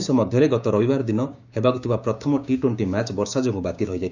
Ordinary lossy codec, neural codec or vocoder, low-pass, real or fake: none; codec, 16 kHz, 6 kbps, DAC; 7.2 kHz; fake